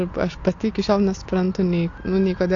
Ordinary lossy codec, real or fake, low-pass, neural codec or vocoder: AAC, 48 kbps; real; 7.2 kHz; none